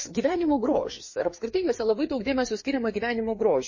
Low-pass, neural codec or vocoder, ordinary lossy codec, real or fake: 7.2 kHz; codec, 16 kHz, 4 kbps, FreqCodec, larger model; MP3, 32 kbps; fake